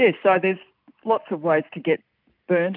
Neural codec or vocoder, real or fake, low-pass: none; real; 5.4 kHz